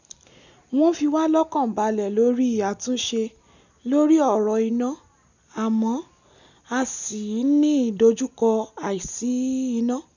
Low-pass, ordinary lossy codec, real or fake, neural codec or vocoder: 7.2 kHz; none; real; none